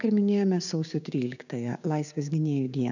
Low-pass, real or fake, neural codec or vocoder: 7.2 kHz; fake; codec, 16 kHz, 6 kbps, DAC